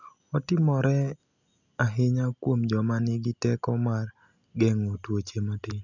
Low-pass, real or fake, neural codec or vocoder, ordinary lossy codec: 7.2 kHz; real; none; none